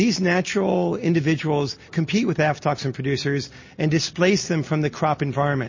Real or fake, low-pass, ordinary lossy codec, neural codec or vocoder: real; 7.2 kHz; MP3, 32 kbps; none